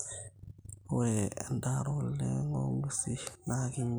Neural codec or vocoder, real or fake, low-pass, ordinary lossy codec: none; real; none; none